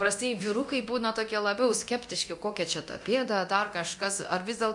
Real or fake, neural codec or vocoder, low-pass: fake; codec, 24 kHz, 0.9 kbps, DualCodec; 10.8 kHz